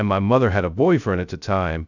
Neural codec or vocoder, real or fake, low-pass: codec, 16 kHz, 0.2 kbps, FocalCodec; fake; 7.2 kHz